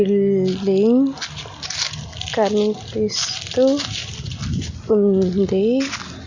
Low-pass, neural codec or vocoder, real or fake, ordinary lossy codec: 7.2 kHz; none; real; Opus, 64 kbps